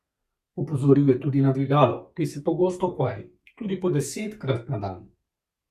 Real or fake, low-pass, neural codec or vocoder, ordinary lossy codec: fake; 14.4 kHz; codec, 32 kHz, 1.9 kbps, SNAC; Opus, 64 kbps